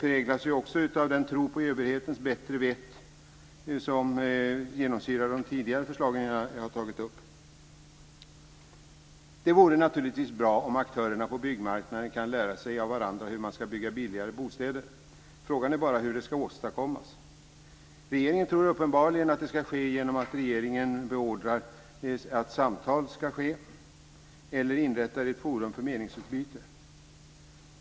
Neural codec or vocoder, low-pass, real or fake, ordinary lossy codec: none; none; real; none